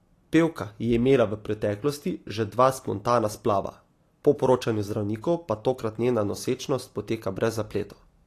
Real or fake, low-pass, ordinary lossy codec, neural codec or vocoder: real; 14.4 kHz; AAC, 48 kbps; none